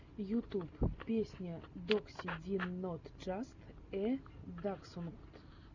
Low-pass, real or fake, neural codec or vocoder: 7.2 kHz; real; none